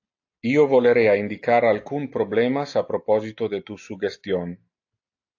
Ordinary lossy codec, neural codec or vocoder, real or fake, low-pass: AAC, 48 kbps; none; real; 7.2 kHz